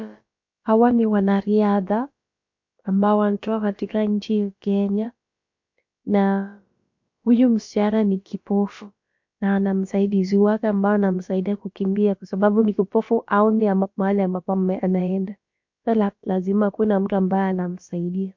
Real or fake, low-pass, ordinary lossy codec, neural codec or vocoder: fake; 7.2 kHz; MP3, 48 kbps; codec, 16 kHz, about 1 kbps, DyCAST, with the encoder's durations